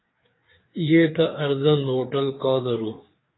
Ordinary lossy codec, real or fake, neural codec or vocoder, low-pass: AAC, 16 kbps; fake; codec, 16 kHz, 4 kbps, FreqCodec, larger model; 7.2 kHz